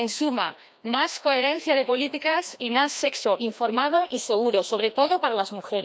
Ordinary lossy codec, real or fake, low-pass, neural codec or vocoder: none; fake; none; codec, 16 kHz, 1 kbps, FreqCodec, larger model